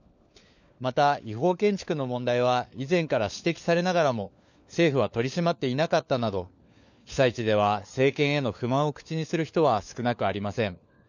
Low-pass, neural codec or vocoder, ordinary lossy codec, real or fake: 7.2 kHz; codec, 16 kHz, 4 kbps, FunCodec, trained on LibriTTS, 50 frames a second; none; fake